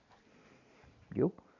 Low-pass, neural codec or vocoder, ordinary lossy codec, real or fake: 7.2 kHz; none; none; real